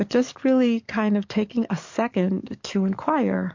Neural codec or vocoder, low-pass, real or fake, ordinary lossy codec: codec, 44.1 kHz, 7.8 kbps, DAC; 7.2 kHz; fake; MP3, 48 kbps